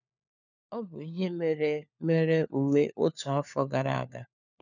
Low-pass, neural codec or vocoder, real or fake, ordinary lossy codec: 7.2 kHz; codec, 16 kHz, 4 kbps, FunCodec, trained on LibriTTS, 50 frames a second; fake; none